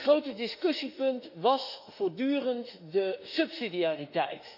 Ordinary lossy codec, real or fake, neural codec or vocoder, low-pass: none; fake; autoencoder, 48 kHz, 32 numbers a frame, DAC-VAE, trained on Japanese speech; 5.4 kHz